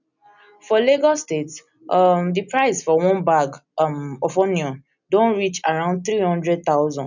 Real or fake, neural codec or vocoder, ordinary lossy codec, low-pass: real; none; none; 7.2 kHz